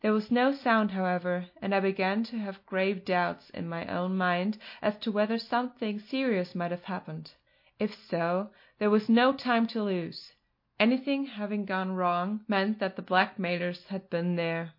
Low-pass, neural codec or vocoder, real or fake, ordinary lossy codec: 5.4 kHz; none; real; MP3, 32 kbps